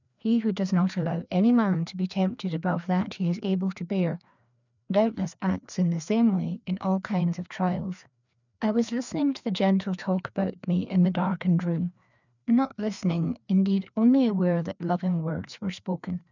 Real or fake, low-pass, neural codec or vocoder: fake; 7.2 kHz; codec, 16 kHz, 2 kbps, FreqCodec, larger model